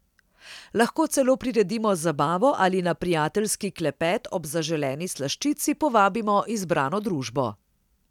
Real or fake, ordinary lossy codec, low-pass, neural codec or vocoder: real; none; 19.8 kHz; none